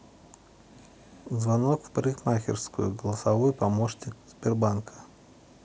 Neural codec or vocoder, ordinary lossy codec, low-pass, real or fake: none; none; none; real